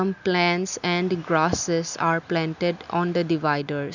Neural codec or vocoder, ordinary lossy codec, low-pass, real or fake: none; none; 7.2 kHz; real